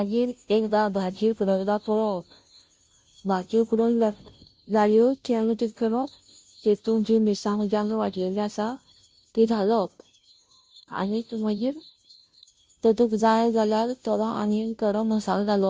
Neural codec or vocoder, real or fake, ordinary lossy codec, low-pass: codec, 16 kHz, 0.5 kbps, FunCodec, trained on Chinese and English, 25 frames a second; fake; none; none